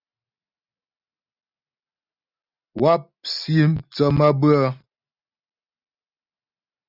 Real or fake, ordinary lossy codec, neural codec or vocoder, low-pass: real; Opus, 64 kbps; none; 5.4 kHz